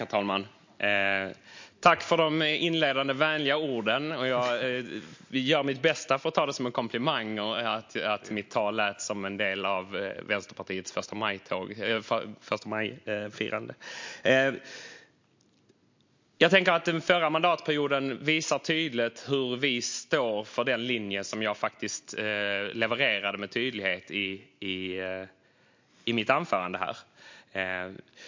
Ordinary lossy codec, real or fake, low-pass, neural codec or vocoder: MP3, 48 kbps; real; 7.2 kHz; none